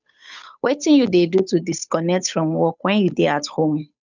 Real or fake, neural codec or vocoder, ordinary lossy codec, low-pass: fake; codec, 16 kHz, 8 kbps, FunCodec, trained on Chinese and English, 25 frames a second; none; 7.2 kHz